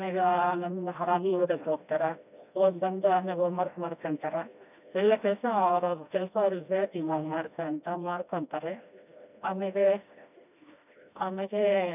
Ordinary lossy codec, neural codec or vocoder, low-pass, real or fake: none; codec, 16 kHz, 1 kbps, FreqCodec, smaller model; 3.6 kHz; fake